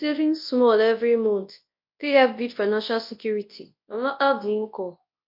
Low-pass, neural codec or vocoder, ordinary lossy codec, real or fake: 5.4 kHz; codec, 24 kHz, 0.9 kbps, WavTokenizer, large speech release; MP3, 32 kbps; fake